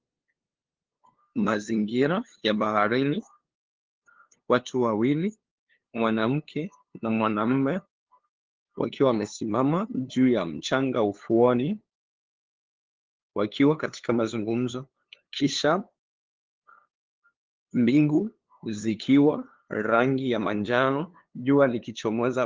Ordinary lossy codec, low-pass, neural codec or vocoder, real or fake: Opus, 16 kbps; 7.2 kHz; codec, 16 kHz, 2 kbps, FunCodec, trained on LibriTTS, 25 frames a second; fake